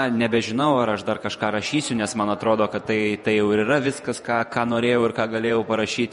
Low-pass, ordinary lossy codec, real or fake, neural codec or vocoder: 19.8 kHz; MP3, 48 kbps; fake; vocoder, 48 kHz, 128 mel bands, Vocos